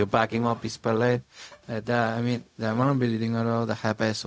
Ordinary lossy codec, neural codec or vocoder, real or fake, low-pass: none; codec, 16 kHz, 0.4 kbps, LongCat-Audio-Codec; fake; none